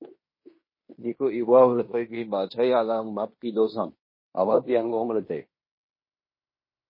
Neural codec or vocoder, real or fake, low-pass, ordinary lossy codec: codec, 16 kHz in and 24 kHz out, 0.9 kbps, LongCat-Audio-Codec, fine tuned four codebook decoder; fake; 5.4 kHz; MP3, 24 kbps